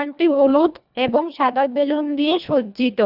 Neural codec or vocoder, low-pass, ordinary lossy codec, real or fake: codec, 24 kHz, 1.5 kbps, HILCodec; 5.4 kHz; none; fake